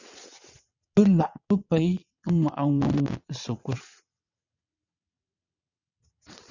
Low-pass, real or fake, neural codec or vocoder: 7.2 kHz; fake; vocoder, 22.05 kHz, 80 mel bands, WaveNeXt